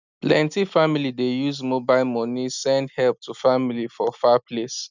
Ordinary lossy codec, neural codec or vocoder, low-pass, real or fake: none; none; 7.2 kHz; real